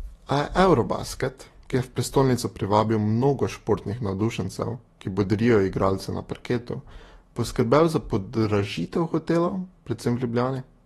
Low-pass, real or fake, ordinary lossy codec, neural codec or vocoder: 19.8 kHz; fake; AAC, 32 kbps; autoencoder, 48 kHz, 128 numbers a frame, DAC-VAE, trained on Japanese speech